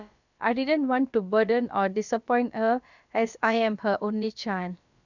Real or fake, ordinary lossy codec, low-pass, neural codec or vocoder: fake; none; 7.2 kHz; codec, 16 kHz, about 1 kbps, DyCAST, with the encoder's durations